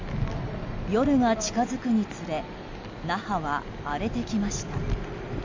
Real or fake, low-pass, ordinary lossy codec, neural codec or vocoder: real; 7.2 kHz; MP3, 64 kbps; none